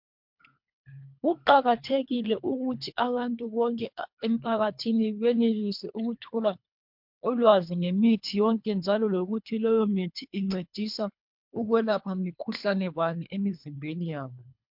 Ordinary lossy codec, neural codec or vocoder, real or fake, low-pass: MP3, 48 kbps; codec, 24 kHz, 3 kbps, HILCodec; fake; 5.4 kHz